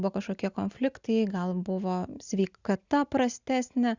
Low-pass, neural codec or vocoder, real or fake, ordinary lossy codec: 7.2 kHz; none; real; Opus, 64 kbps